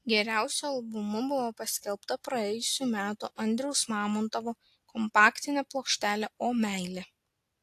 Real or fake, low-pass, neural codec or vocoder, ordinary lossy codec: real; 14.4 kHz; none; AAC, 64 kbps